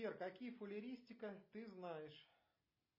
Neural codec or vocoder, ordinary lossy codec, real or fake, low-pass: none; MP3, 24 kbps; real; 5.4 kHz